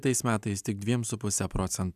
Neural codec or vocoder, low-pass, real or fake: none; 14.4 kHz; real